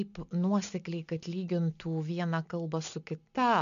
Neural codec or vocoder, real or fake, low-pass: none; real; 7.2 kHz